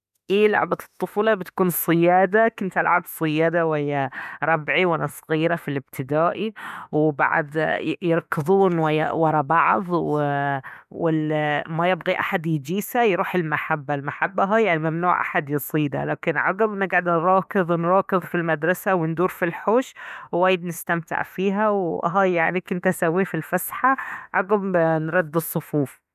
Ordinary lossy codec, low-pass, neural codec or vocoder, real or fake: none; 14.4 kHz; autoencoder, 48 kHz, 32 numbers a frame, DAC-VAE, trained on Japanese speech; fake